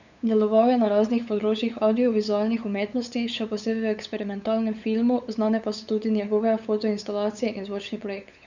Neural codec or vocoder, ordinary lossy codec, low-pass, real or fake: codec, 16 kHz, 8 kbps, FunCodec, trained on LibriTTS, 25 frames a second; none; 7.2 kHz; fake